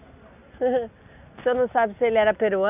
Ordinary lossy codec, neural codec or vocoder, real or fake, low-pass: none; none; real; 3.6 kHz